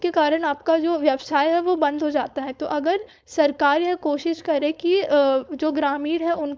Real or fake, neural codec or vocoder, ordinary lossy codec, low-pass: fake; codec, 16 kHz, 4.8 kbps, FACodec; none; none